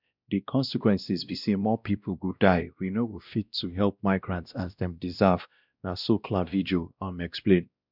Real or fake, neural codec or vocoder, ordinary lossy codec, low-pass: fake; codec, 16 kHz, 1 kbps, X-Codec, WavLM features, trained on Multilingual LibriSpeech; none; 5.4 kHz